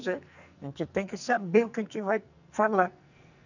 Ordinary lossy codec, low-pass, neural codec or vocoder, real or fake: none; 7.2 kHz; codec, 44.1 kHz, 2.6 kbps, SNAC; fake